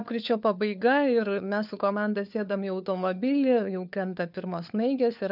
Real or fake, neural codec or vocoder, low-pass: fake; codec, 16 kHz, 4 kbps, FunCodec, trained on LibriTTS, 50 frames a second; 5.4 kHz